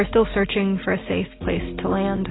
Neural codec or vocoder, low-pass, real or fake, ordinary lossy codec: none; 7.2 kHz; real; AAC, 16 kbps